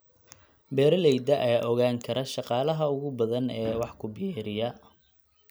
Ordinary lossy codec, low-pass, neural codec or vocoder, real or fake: none; none; none; real